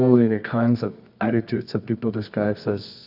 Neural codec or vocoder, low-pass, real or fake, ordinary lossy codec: codec, 24 kHz, 0.9 kbps, WavTokenizer, medium music audio release; 5.4 kHz; fake; AAC, 48 kbps